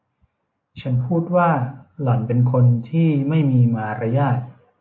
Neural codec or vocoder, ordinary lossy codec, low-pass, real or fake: none; AAC, 48 kbps; 7.2 kHz; real